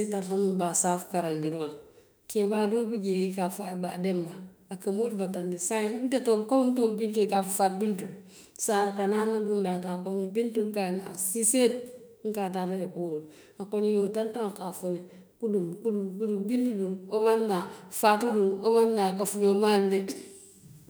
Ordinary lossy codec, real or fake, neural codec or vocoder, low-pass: none; fake; autoencoder, 48 kHz, 32 numbers a frame, DAC-VAE, trained on Japanese speech; none